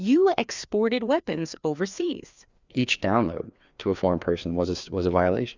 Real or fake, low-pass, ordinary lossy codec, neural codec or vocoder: fake; 7.2 kHz; Opus, 64 kbps; codec, 16 kHz, 2 kbps, FreqCodec, larger model